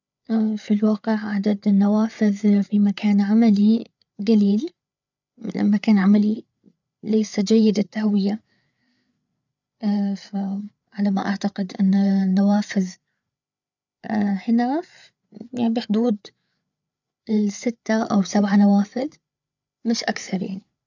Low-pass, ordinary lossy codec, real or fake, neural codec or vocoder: 7.2 kHz; none; fake; codec, 16 kHz, 8 kbps, FreqCodec, larger model